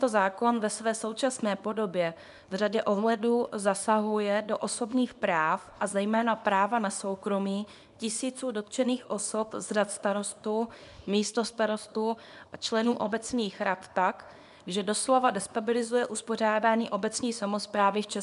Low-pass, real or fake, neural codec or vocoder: 10.8 kHz; fake; codec, 24 kHz, 0.9 kbps, WavTokenizer, small release